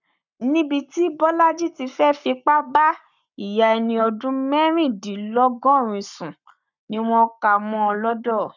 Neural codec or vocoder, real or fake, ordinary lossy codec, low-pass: codec, 44.1 kHz, 7.8 kbps, Pupu-Codec; fake; none; 7.2 kHz